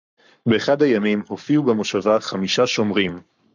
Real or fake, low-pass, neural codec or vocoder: fake; 7.2 kHz; codec, 44.1 kHz, 7.8 kbps, Pupu-Codec